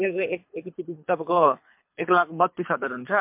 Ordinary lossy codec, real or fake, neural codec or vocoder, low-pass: MP3, 32 kbps; fake; codec, 24 kHz, 3 kbps, HILCodec; 3.6 kHz